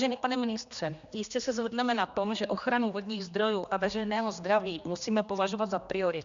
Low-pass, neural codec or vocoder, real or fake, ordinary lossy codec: 7.2 kHz; codec, 16 kHz, 2 kbps, X-Codec, HuBERT features, trained on general audio; fake; Opus, 64 kbps